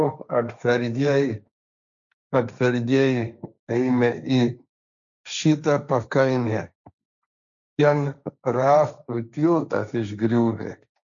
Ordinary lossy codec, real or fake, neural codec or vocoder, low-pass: MP3, 96 kbps; fake; codec, 16 kHz, 1.1 kbps, Voila-Tokenizer; 7.2 kHz